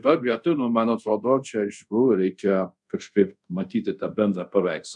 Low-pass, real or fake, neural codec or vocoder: 10.8 kHz; fake; codec, 24 kHz, 0.5 kbps, DualCodec